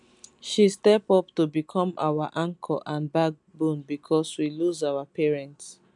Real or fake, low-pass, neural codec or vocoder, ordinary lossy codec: real; 10.8 kHz; none; none